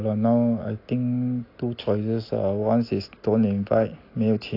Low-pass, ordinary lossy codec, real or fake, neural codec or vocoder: 5.4 kHz; AAC, 32 kbps; real; none